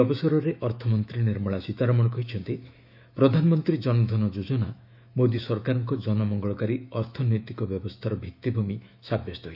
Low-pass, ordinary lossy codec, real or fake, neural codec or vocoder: 5.4 kHz; none; fake; autoencoder, 48 kHz, 128 numbers a frame, DAC-VAE, trained on Japanese speech